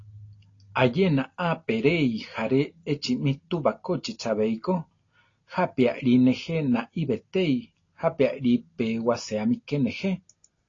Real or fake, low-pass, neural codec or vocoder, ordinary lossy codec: real; 7.2 kHz; none; AAC, 32 kbps